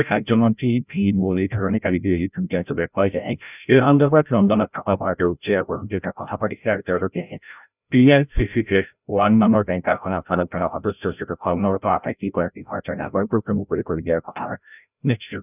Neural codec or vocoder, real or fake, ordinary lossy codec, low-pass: codec, 16 kHz, 0.5 kbps, FreqCodec, larger model; fake; none; 3.6 kHz